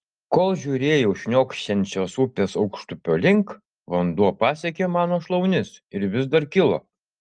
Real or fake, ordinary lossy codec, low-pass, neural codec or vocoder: real; Opus, 32 kbps; 9.9 kHz; none